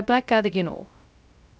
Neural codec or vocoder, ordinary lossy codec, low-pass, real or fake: codec, 16 kHz, 0.2 kbps, FocalCodec; none; none; fake